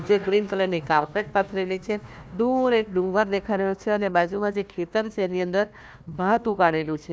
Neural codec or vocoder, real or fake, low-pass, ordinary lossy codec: codec, 16 kHz, 1 kbps, FunCodec, trained on Chinese and English, 50 frames a second; fake; none; none